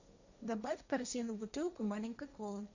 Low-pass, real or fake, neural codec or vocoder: 7.2 kHz; fake; codec, 16 kHz, 1.1 kbps, Voila-Tokenizer